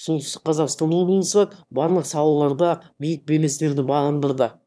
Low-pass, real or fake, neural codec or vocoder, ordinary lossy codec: none; fake; autoencoder, 22.05 kHz, a latent of 192 numbers a frame, VITS, trained on one speaker; none